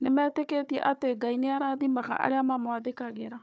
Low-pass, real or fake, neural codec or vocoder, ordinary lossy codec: none; fake; codec, 16 kHz, 4 kbps, FunCodec, trained on Chinese and English, 50 frames a second; none